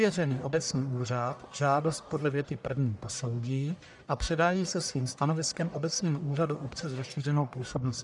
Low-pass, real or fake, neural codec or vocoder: 10.8 kHz; fake; codec, 44.1 kHz, 1.7 kbps, Pupu-Codec